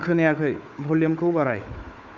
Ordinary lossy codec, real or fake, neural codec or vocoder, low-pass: MP3, 64 kbps; fake; codec, 16 kHz, 4 kbps, FunCodec, trained on Chinese and English, 50 frames a second; 7.2 kHz